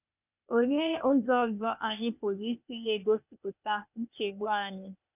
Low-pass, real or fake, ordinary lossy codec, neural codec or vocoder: 3.6 kHz; fake; none; codec, 16 kHz, 0.8 kbps, ZipCodec